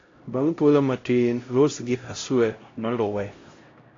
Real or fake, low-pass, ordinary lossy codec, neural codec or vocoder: fake; 7.2 kHz; AAC, 32 kbps; codec, 16 kHz, 0.5 kbps, X-Codec, HuBERT features, trained on LibriSpeech